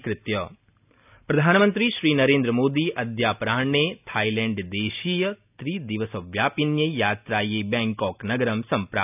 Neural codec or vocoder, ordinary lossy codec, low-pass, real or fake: none; none; 3.6 kHz; real